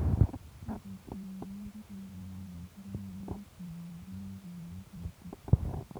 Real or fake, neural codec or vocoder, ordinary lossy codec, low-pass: fake; vocoder, 44.1 kHz, 128 mel bands every 512 samples, BigVGAN v2; none; none